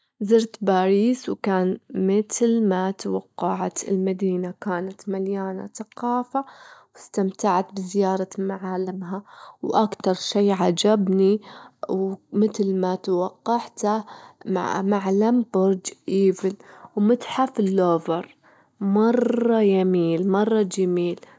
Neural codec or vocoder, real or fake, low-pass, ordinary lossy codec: none; real; none; none